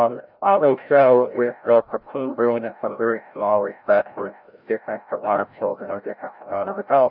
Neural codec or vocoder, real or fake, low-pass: codec, 16 kHz, 0.5 kbps, FreqCodec, larger model; fake; 5.4 kHz